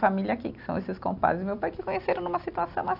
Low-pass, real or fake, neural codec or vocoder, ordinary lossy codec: 5.4 kHz; real; none; AAC, 48 kbps